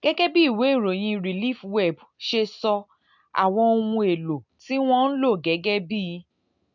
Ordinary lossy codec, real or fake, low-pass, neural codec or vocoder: none; real; 7.2 kHz; none